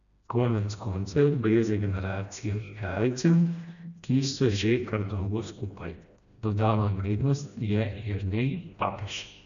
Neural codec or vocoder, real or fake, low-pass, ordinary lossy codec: codec, 16 kHz, 1 kbps, FreqCodec, smaller model; fake; 7.2 kHz; none